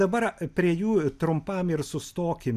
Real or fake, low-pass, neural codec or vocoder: real; 14.4 kHz; none